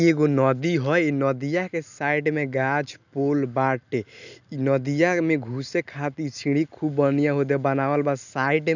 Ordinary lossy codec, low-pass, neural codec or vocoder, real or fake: none; 7.2 kHz; none; real